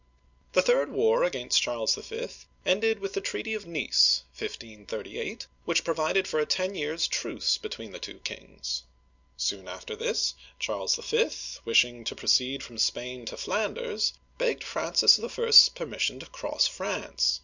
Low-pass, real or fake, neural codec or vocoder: 7.2 kHz; real; none